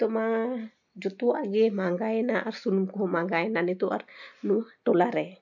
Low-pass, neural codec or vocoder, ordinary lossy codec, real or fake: 7.2 kHz; none; none; real